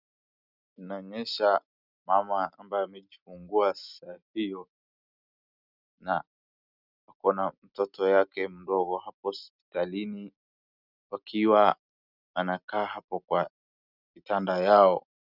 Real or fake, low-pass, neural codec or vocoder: real; 5.4 kHz; none